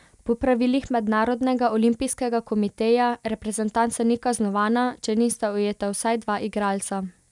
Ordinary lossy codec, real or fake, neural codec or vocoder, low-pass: none; real; none; 10.8 kHz